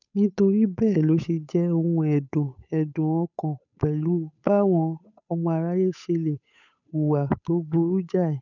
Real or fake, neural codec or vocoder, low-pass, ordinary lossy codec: fake; codec, 16 kHz, 8 kbps, FunCodec, trained on Chinese and English, 25 frames a second; 7.2 kHz; none